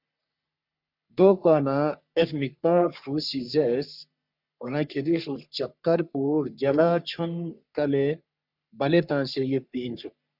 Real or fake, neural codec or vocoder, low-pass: fake; codec, 44.1 kHz, 3.4 kbps, Pupu-Codec; 5.4 kHz